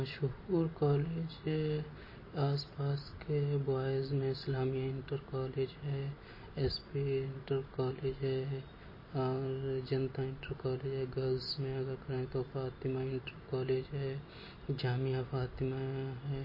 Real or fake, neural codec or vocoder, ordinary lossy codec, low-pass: real; none; MP3, 24 kbps; 5.4 kHz